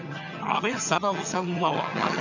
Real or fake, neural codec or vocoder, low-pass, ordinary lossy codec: fake; vocoder, 22.05 kHz, 80 mel bands, HiFi-GAN; 7.2 kHz; none